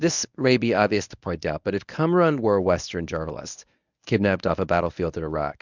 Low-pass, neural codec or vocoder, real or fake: 7.2 kHz; codec, 24 kHz, 0.9 kbps, WavTokenizer, medium speech release version 1; fake